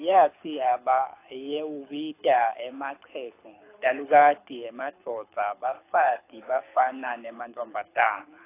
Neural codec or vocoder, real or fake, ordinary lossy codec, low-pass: codec, 24 kHz, 6 kbps, HILCodec; fake; AAC, 24 kbps; 3.6 kHz